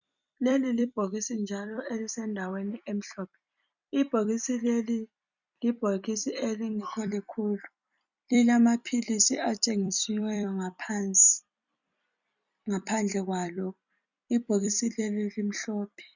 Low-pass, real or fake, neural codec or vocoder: 7.2 kHz; fake; vocoder, 44.1 kHz, 128 mel bands every 256 samples, BigVGAN v2